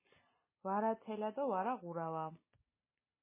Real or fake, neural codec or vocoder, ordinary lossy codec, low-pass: real; none; MP3, 16 kbps; 3.6 kHz